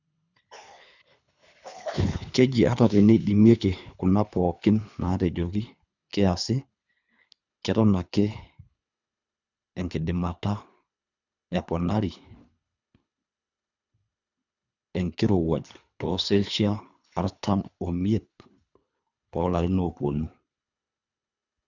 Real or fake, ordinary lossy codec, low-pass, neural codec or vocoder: fake; none; 7.2 kHz; codec, 24 kHz, 3 kbps, HILCodec